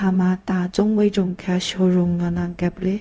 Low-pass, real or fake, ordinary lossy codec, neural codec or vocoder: none; fake; none; codec, 16 kHz, 0.4 kbps, LongCat-Audio-Codec